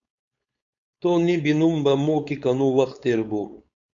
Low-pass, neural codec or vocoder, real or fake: 7.2 kHz; codec, 16 kHz, 4.8 kbps, FACodec; fake